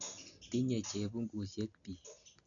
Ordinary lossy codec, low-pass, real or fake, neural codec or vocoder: none; 7.2 kHz; real; none